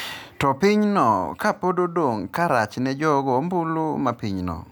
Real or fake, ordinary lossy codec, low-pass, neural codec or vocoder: real; none; none; none